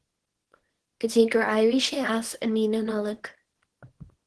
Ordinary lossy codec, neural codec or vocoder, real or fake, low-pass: Opus, 16 kbps; codec, 24 kHz, 0.9 kbps, WavTokenizer, small release; fake; 10.8 kHz